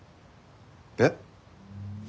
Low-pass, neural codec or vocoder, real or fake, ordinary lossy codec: none; none; real; none